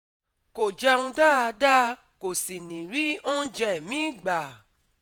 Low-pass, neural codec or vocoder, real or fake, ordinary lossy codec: none; vocoder, 48 kHz, 128 mel bands, Vocos; fake; none